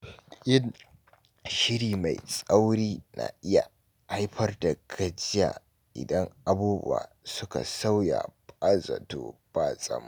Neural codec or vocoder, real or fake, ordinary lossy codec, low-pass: none; real; none; none